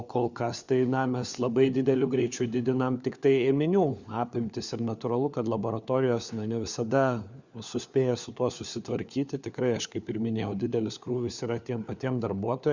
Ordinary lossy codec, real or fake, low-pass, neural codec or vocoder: Opus, 64 kbps; fake; 7.2 kHz; codec, 16 kHz, 4 kbps, FunCodec, trained on LibriTTS, 50 frames a second